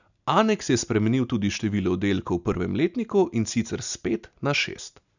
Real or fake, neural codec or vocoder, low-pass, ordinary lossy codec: real; none; 7.2 kHz; none